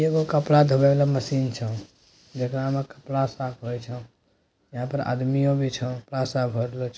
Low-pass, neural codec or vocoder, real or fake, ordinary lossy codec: none; none; real; none